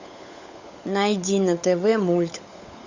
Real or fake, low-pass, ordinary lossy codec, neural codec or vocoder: fake; 7.2 kHz; Opus, 64 kbps; codec, 16 kHz, 16 kbps, FunCodec, trained on LibriTTS, 50 frames a second